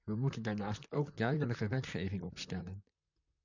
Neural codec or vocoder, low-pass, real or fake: vocoder, 44.1 kHz, 128 mel bands, Pupu-Vocoder; 7.2 kHz; fake